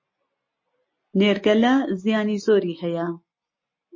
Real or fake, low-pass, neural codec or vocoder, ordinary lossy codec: real; 7.2 kHz; none; MP3, 32 kbps